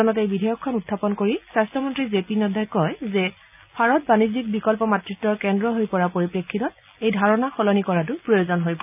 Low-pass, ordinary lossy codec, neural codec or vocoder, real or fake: 3.6 kHz; none; none; real